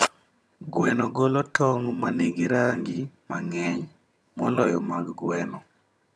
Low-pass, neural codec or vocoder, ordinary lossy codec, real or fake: none; vocoder, 22.05 kHz, 80 mel bands, HiFi-GAN; none; fake